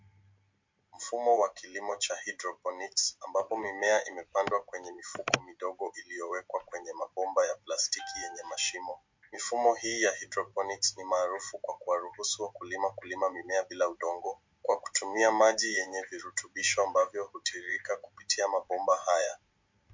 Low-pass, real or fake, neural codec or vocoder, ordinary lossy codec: 7.2 kHz; real; none; MP3, 48 kbps